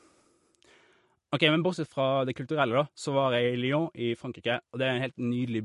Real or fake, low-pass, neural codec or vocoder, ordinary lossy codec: real; 14.4 kHz; none; MP3, 48 kbps